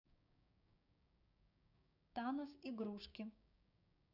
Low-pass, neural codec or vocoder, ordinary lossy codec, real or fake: 5.4 kHz; codec, 16 kHz, 6 kbps, DAC; none; fake